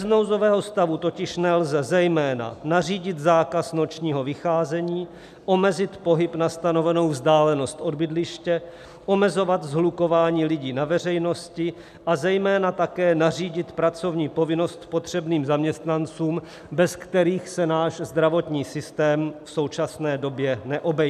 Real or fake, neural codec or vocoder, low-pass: real; none; 14.4 kHz